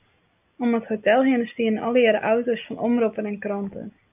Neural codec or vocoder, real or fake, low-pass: none; real; 3.6 kHz